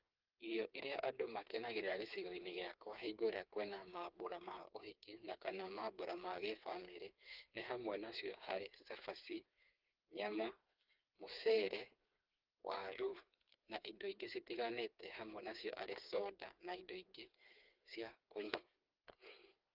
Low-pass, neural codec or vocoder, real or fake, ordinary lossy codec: 5.4 kHz; codec, 16 kHz, 4 kbps, FreqCodec, smaller model; fake; Opus, 24 kbps